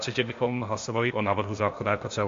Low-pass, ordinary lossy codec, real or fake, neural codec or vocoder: 7.2 kHz; MP3, 48 kbps; fake; codec, 16 kHz, 0.8 kbps, ZipCodec